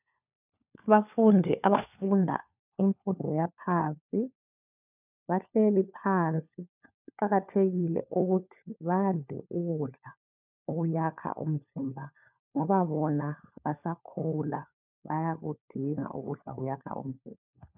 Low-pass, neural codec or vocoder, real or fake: 3.6 kHz; codec, 16 kHz, 4 kbps, FunCodec, trained on LibriTTS, 50 frames a second; fake